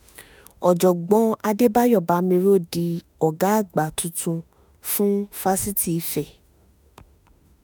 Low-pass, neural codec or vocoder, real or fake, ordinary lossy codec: none; autoencoder, 48 kHz, 32 numbers a frame, DAC-VAE, trained on Japanese speech; fake; none